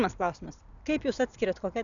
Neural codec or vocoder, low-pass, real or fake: none; 7.2 kHz; real